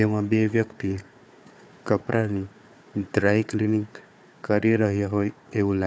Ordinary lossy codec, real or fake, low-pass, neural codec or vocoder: none; fake; none; codec, 16 kHz, 4 kbps, FunCodec, trained on Chinese and English, 50 frames a second